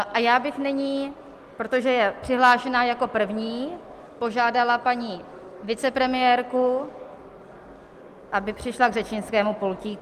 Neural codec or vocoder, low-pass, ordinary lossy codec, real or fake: none; 14.4 kHz; Opus, 24 kbps; real